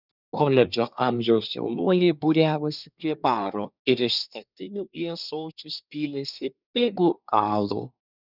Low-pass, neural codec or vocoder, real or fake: 5.4 kHz; codec, 24 kHz, 1 kbps, SNAC; fake